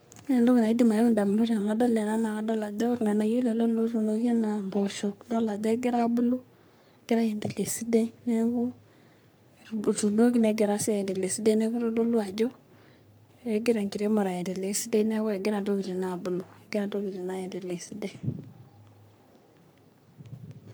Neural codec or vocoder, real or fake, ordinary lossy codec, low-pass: codec, 44.1 kHz, 3.4 kbps, Pupu-Codec; fake; none; none